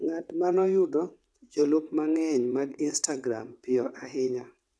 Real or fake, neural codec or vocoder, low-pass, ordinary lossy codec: fake; vocoder, 22.05 kHz, 80 mel bands, WaveNeXt; none; none